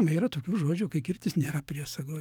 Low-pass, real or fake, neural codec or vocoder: 19.8 kHz; fake; codec, 44.1 kHz, 7.8 kbps, DAC